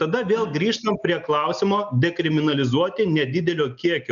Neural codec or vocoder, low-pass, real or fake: none; 7.2 kHz; real